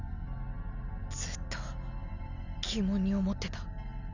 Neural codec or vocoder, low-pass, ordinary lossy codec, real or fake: none; 7.2 kHz; none; real